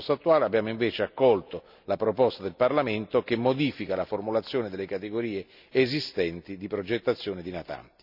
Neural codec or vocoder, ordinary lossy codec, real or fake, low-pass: none; none; real; 5.4 kHz